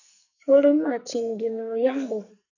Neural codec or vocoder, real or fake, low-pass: codec, 44.1 kHz, 2.6 kbps, SNAC; fake; 7.2 kHz